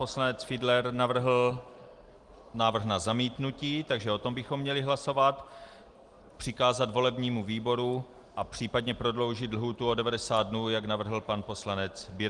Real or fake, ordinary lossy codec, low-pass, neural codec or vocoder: real; Opus, 24 kbps; 10.8 kHz; none